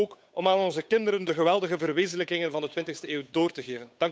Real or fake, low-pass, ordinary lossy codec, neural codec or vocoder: fake; none; none; codec, 16 kHz, 8 kbps, FunCodec, trained on Chinese and English, 25 frames a second